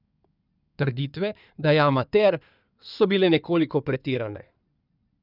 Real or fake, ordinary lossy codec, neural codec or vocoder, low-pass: fake; none; codec, 16 kHz in and 24 kHz out, 2.2 kbps, FireRedTTS-2 codec; 5.4 kHz